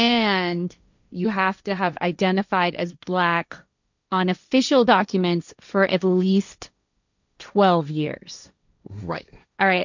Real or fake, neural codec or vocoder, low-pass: fake; codec, 16 kHz, 1.1 kbps, Voila-Tokenizer; 7.2 kHz